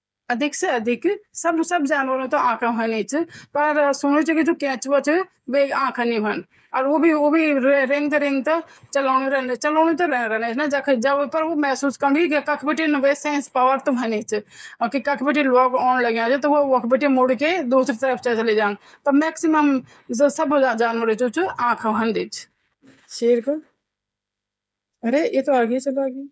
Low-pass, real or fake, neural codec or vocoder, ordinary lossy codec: none; fake; codec, 16 kHz, 8 kbps, FreqCodec, smaller model; none